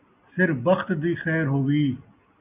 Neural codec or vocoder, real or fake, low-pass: none; real; 3.6 kHz